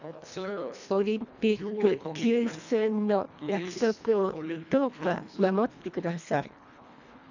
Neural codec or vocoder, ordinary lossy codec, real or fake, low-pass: codec, 24 kHz, 1.5 kbps, HILCodec; none; fake; 7.2 kHz